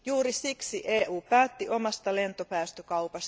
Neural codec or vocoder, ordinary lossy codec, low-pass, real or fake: none; none; none; real